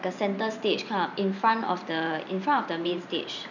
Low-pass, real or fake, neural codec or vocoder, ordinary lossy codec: 7.2 kHz; fake; vocoder, 44.1 kHz, 128 mel bands every 512 samples, BigVGAN v2; none